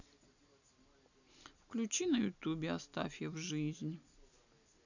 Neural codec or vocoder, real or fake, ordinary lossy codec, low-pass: none; real; none; 7.2 kHz